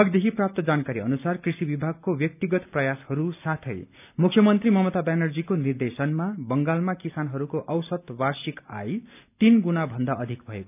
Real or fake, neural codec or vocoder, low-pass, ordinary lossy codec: real; none; 3.6 kHz; none